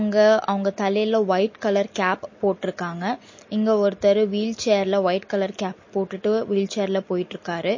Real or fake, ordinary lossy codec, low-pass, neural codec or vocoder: real; MP3, 32 kbps; 7.2 kHz; none